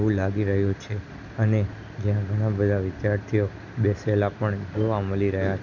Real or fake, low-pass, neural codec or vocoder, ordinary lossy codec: real; 7.2 kHz; none; none